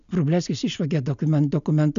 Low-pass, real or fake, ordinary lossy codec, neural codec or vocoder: 7.2 kHz; real; MP3, 96 kbps; none